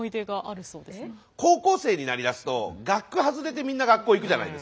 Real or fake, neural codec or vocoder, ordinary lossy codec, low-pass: real; none; none; none